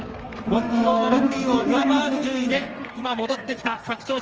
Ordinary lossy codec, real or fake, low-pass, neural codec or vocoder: Opus, 24 kbps; fake; 7.2 kHz; codec, 32 kHz, 1.9 kbps, SNAC